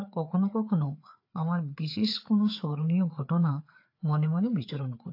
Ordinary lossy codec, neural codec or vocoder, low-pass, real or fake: AAC, 32 kbps; codec, 16 kHz, 4 kbps, FreqCodec, larger model; 5.4 kHz; fake